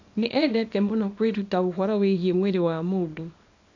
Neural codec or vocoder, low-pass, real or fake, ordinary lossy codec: codec, 24 kHz, 0.9 kbps, WavTokenizer, small release; 7.2 kHz; fake; AAC, 48 kbps